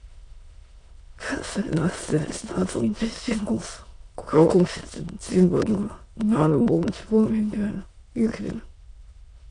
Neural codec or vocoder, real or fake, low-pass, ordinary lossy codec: autoencoder, 22.05 kHz, a latent of 192 numbers a frame, VITS, trained on many speakers; fake; 9.9 kHz; AAC, 48 kbps